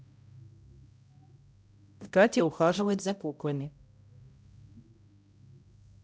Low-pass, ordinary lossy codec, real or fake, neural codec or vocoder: none; none; fake; codec, 16 kHz, 0.5 kbps, X-Codec, HuBERT features, trained on balanced general audio